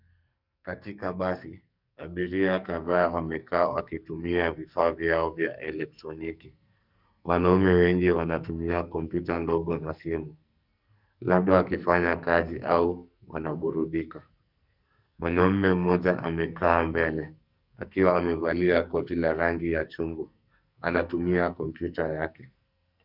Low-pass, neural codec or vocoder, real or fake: 5.4 kHz; codec, 44.1 kHz, 2.6 kbps, SNAC; fake